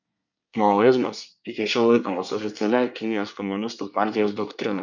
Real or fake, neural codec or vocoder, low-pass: fake; codec, 24 kHz, 1 kbps, SNAC; 7.2 kHz